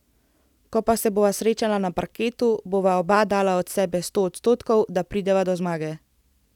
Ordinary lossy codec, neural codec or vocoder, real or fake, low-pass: none; none; real; 19.8 kHz